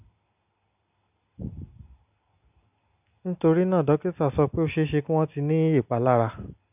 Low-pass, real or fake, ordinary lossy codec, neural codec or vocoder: 3.6 kHz; real; none; none